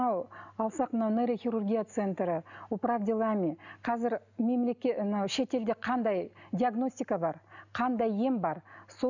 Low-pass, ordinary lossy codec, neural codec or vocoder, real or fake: 7.2 kHz; none; none; real